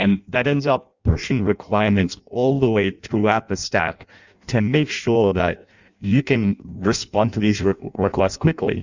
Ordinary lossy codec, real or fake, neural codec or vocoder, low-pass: Opus, 64 kbps; fake; codec, 16 kHz in and 24 kHz out, 0.6 kbps, FireRedTTS-2 codec; 7.2 kHz